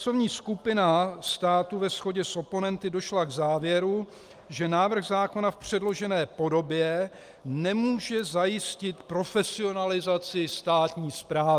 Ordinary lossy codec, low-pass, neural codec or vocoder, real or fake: Opus, 32 kbps; 14.4 kHz; none; real